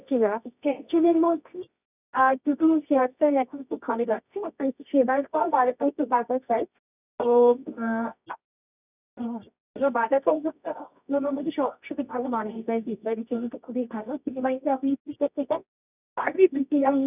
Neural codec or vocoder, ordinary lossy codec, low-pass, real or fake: codec, 24 kHz, 0.9 kbps, WavTokenizer, medium music audio release; none; 3.6 kHz; fake